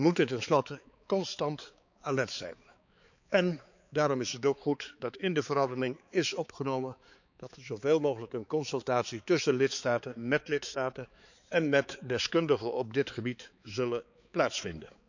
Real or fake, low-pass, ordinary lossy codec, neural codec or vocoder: fake; 7.2 kHz; none; codec, 16 kHz, 4 kbps, X-Codec, HuBERT features, trained on balanced general audio